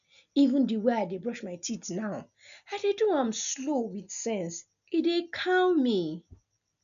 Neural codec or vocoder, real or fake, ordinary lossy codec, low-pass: none; real; none; 7.2 kHz